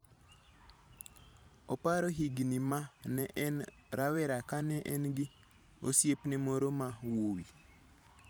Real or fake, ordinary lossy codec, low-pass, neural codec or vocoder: fake; none; none; vocoder, 44.1 kHz, 128 mel bands every 512 samples, BigVGAN v2